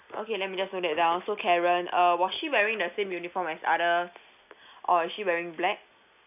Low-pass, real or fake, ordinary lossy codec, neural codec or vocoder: 3.6 kHz; real; none; none